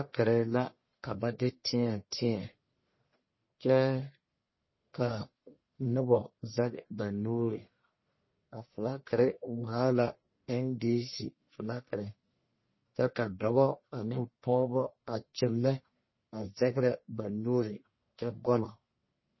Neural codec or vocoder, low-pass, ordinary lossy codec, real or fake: codec, 44.1 kHz, 1.7 kbps, Pupu-Codec; 7.2 kHz; MP3, 24 kbps; fake